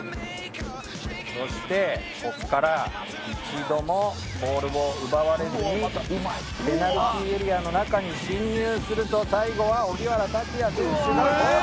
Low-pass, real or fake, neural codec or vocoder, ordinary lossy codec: none; real; none; none